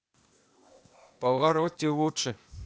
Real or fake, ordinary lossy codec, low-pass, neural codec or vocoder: fake; none; none; codec, 16 kHz, 0.8 kbps, ZipCodec